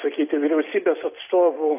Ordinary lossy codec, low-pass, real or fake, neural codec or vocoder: AAC, 32 kbps; 3.6 kHz; real; none